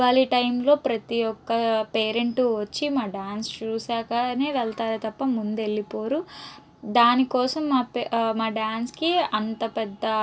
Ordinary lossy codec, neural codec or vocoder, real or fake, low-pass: none; none; real; none